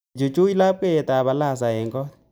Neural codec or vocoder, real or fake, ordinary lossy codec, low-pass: none; real; none; none